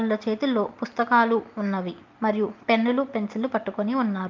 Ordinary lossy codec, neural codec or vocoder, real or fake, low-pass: Opus, 32 kbps; none; real; 7.2 kHz